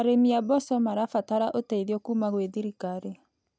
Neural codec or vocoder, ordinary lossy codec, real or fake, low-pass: none; none; real; none